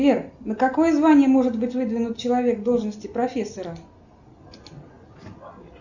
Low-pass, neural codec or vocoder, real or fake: 7.2 kHz; none; real